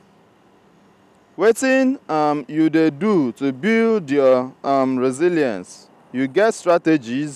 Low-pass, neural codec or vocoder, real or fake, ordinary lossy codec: 14.4 kHz; none; real; none